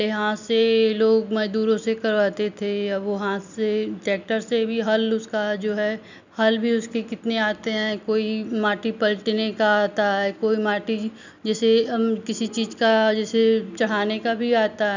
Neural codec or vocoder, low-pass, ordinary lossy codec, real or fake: none; 7.2 kHz; none; real